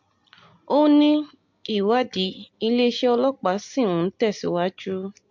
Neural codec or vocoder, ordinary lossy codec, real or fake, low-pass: none; MP3, 48 kbps; real; 7.2 kHz